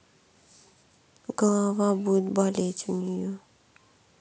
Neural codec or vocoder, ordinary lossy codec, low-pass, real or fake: none; none; none; real